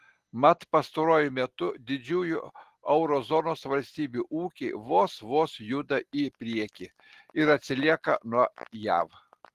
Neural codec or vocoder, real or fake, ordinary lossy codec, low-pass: none; real; Opus, 16 kbps; 14.4 kHz